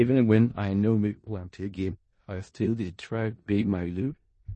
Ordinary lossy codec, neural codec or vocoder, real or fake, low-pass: MP3, 32 kbps; codec, 16 kHz in and 24 kHz out, 0.4 kbps, LongCat-Audio-Codec, four codebook decoder; fake; 10.8 kHz